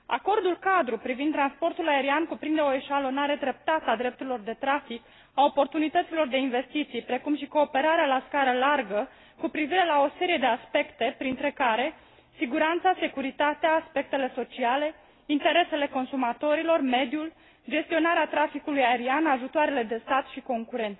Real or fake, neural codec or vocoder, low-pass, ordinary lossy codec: real; none; 7.2 kHz; AAC, 16 kbps